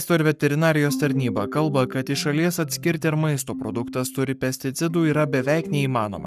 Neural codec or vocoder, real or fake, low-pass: codec, 44.1 kHz, 7.8 kbps, Pupu-Codec; fake; 14.4 kHz